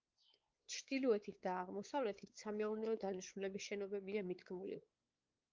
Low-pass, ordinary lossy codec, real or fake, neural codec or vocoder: 7.2 kHz; Opus, 32 kbps; fake; codec, 16 kHz, 4 kbps, X-Codec, WavLM features, trained on Multilingual LibriSpeech